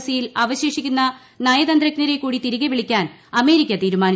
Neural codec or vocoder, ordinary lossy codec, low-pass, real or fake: none; none; none; real